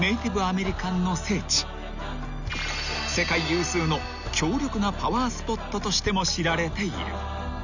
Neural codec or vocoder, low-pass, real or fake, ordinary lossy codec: none; 7.2 kHz; real; none